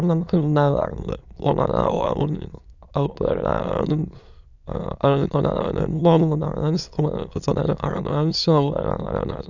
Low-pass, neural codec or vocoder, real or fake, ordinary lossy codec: 7.2 kHz; autoencoder, 22.05 kHz, a latent of 192 numbers a frame, VITS, trained on many speakers; fake; none